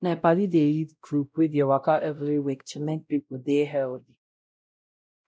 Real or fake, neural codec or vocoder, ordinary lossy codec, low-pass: fake; codec, 16 kHz, 0.5 kbps, X-Codec, WavLM features, trained on Multilingual LibriSpeech; none; none